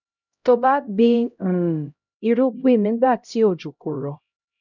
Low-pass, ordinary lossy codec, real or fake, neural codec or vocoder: 7.2 kHz; none; fake; codec, 16 kHz, 0.5 kbps, X-Codec, HuBERT features, trained on LibriSpeech